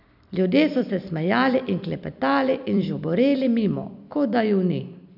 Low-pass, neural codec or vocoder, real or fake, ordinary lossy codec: 5.4 kHz; vocoder, 44.1 kHz, 128 mel bands every 256 samples, BigVGAN v2; fake; none